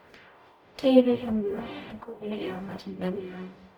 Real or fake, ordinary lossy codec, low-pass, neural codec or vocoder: fake; none; 19.8 kHz; codec, 44.1 kHz, 0.9 kbps, DAC